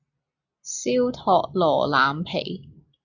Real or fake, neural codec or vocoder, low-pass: real; none; 7.2 kHz